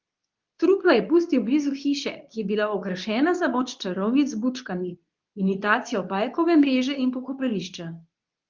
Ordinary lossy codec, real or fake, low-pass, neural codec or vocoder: Opus, 24 kbps; fake; 7.2 kHz; codec, 24 kHz, 0.9 kbps, WavTokenizer, medium speech release version 2